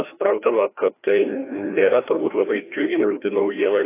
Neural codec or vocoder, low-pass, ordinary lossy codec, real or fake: codec, 16 kHz, 1 kbps, FreqCodec, larger model; 3.6 kHz; AAC, 24 kbps; fake